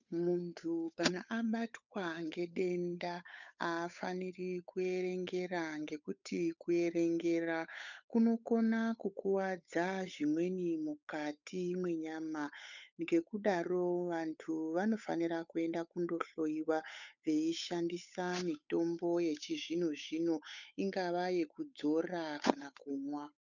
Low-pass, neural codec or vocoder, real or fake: 7.2 kHz; codec, 16 kHz, 8 kbps, FunCodec, trained on Chinese and English, 25 frames a second; fake